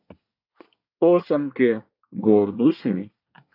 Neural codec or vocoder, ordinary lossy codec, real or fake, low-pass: codec, 24 kHz, 1 kbps, SNAC; AAC, 48 kbps; fake; 5.4 kHz